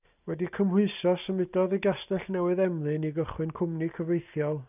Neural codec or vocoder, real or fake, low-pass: none; real; 3.6 kHz